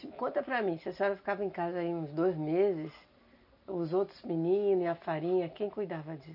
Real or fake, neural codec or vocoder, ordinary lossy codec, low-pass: real; none; none; 5.4 kHz